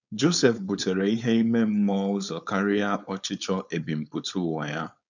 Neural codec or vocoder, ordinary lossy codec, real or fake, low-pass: codec, 16 kHz, 4.8 kbps, FACodec; none; fake; 7.2 kHz